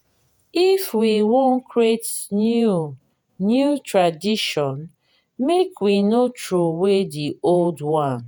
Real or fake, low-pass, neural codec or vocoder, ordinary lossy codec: fake; none; vocoder, 48 kHz, 128 mel bands, Vocos; none